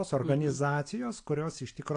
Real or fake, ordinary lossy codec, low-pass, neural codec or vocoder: real; AAC, 48 kbps; 9.9 kHz; none